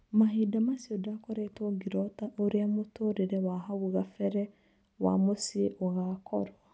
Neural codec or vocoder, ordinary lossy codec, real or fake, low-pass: none; none; real; none